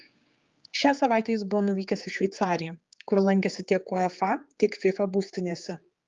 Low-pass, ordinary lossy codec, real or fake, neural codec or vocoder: 7.2 kHz; Opus, 32 kbps; fake; codec, 16 kHz, 4 kbps, X-Codec, HuBERT features, trained on general audio